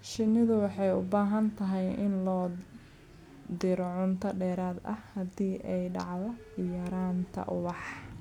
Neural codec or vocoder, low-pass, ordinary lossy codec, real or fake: none; 19.8 kHz; none; real